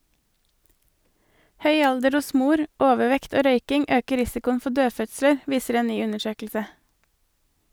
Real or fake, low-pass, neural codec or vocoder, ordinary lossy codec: real; none; none; none